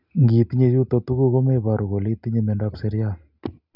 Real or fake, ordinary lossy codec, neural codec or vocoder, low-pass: real; none; none; 5.4 kHz